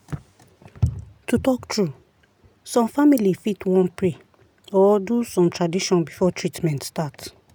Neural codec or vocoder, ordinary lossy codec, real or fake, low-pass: none; none; real; none